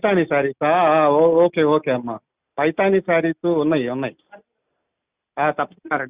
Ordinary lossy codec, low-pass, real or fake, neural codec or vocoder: Opus, 64 kbps; 3.6 kHz; real; none